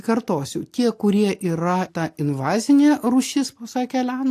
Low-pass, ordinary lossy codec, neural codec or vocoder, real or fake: 14.4 kHz; AAC, 64 kbps; none; real